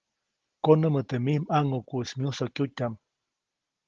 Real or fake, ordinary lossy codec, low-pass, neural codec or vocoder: real; Opus, 16 kbps; 7.2 kHz; none